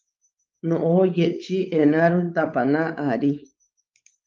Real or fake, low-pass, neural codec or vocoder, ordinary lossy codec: fake; 7.2 kHz; codec, 16 kHz, 4 kbps, X-Codec, WavLM features, trained on Multilingual LibriSpeech; Opus, 24 kbps